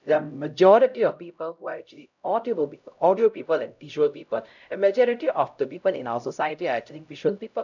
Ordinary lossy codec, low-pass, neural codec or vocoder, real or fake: none; 7.2 kHz; codec, 16 kHz, 0.5 kbps, X-Codec, HuBERT features, trained on LibriSpeech; fake